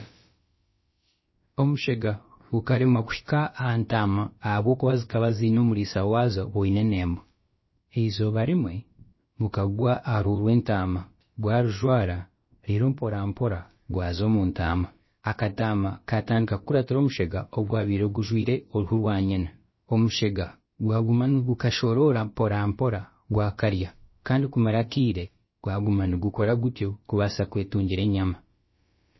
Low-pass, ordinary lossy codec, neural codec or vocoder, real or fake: 7.2 kHz; MP3, 24 kbps; codec, 16 kHz, about 1 kbps, DyCAST, with the encoder's durations; fake